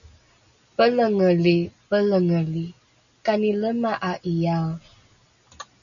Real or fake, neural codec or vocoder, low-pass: real; none; 7.2 kHz